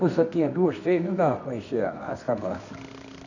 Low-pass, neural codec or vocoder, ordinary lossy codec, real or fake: 7.2 kHz; codec, 24 kHz, 0.9 kbps, WavTokenizer, medium music audio release; none; fake